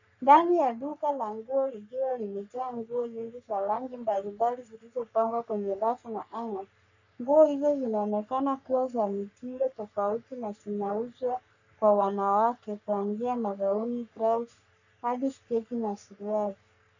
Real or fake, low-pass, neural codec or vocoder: fake; 7.2 kHz; codec, 44.1 kHz, 3.4 kbps, Pupu-Codec